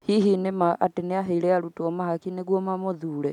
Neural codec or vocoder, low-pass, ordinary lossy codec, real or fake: none; 19.8 kHz; none; real